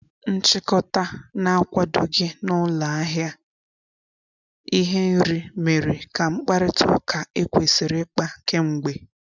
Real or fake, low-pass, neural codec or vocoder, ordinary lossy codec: real; 7.2 kHz; none; none